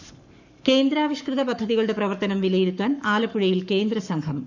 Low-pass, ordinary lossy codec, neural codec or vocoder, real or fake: 7.2 kHz; none; codec, 44.1 kHz, 7.8 kbps, Pupu-Codec; fake